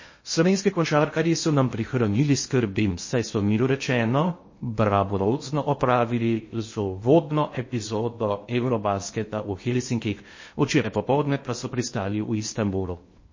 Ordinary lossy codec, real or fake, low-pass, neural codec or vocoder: MP3, 32 kbps; fake; 7.2 kHz; codec, 16 kHz in and 24 kHz out, 0.6 kbps, FocalCodec, streaming, 2048 codes